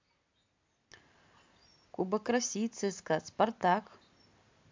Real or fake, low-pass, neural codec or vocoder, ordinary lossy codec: real; 7.2 kHz; none; MP3, 64 kbps